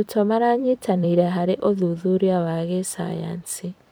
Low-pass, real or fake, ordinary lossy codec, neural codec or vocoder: none; fake; none; vocoder, 44.1 kHz, 128 mel bands every 256 samples, BigVGAN v2